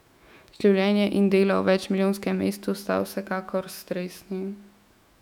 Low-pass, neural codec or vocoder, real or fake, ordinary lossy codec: 19.8 kHz; autoencoder, 48 kHz, 128 numbers a frame, DAC-VAE, trained on Japanese speech; fake; none